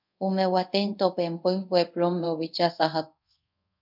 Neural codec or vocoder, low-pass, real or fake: codec, 24 kHz, 0.5 kbps, DualCodec; 5.4 kHz; fake